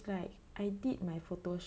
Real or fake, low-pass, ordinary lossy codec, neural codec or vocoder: real; none; none; none